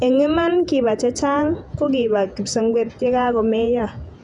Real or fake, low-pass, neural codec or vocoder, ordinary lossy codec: fake; 10.8 kHz; vocoder, 48 kHz, 128 mel bands, Vocos; none